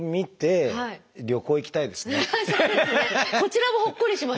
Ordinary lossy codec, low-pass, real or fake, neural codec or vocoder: none; none; real; none